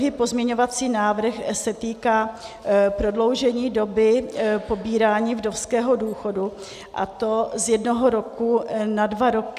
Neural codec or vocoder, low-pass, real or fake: none; 14.4 kHz; real